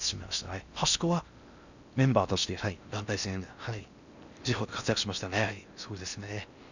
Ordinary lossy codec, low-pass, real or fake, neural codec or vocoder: none; 7.2 kHz; fake; codec, 16 kHz in and 24 kHz out, 0.6 kbps, FocalCodec, streaming, 4096 codes